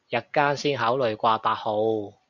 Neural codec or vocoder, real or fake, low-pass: none; real; 7.2 kHz